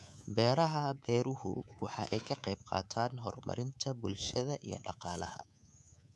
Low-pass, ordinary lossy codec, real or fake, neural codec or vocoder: none; none; fake; codec, 24 kHz, 3.1 kbps, DualCodec